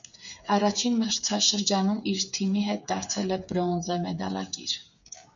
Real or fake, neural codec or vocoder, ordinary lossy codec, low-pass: fake; codec, 16 kHz, 8 kbps, FreqCodec, smaller model; MP3, 96 kbps; 7.2 kHz